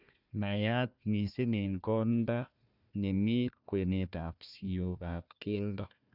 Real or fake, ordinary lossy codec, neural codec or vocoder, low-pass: fake; none; codec, 16 kHz, 1 kbps, FunCodec, trained on Chinese and English, 50 frames a second; 5.4 kHz